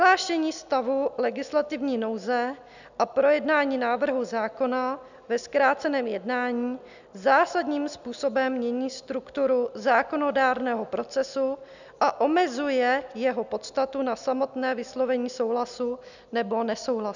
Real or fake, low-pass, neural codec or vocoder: real; 7.2 kHz; none